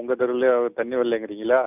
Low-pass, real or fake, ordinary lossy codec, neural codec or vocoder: 3.6 kHz; real; none; none